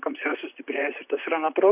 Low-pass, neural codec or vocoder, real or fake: 3.6 kHz; vocoder, 44.1 kHz, 128 mel bands, Pupu-Vocoder; fake